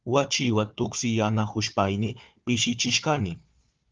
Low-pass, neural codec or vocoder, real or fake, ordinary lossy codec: 7.2 kHz; codec, 16 kHz, 4 kbps, FunCodec, trained on Chinese and English, 50 frames a second; fake; Opus, 32 kbps